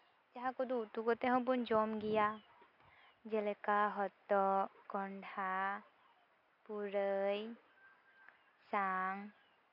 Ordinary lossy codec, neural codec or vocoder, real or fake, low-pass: none; none; real; 5.4 kHz